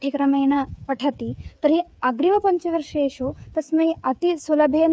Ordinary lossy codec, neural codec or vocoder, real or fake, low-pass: none; codec, 16 kHz, 8 kbps, FreqCodec, smaller model; fake; none